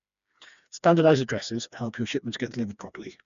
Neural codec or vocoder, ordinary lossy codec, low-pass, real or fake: codec, 16 kHz, 2 kbps, FreqCodec, smaller model; none; 7.2 kHz; fake